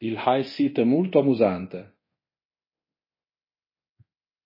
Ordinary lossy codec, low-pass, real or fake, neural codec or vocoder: MP3, 24 kbps; 5.4 kHz; fake; codec, 24 kHz, 0.9 kbps, DualCodec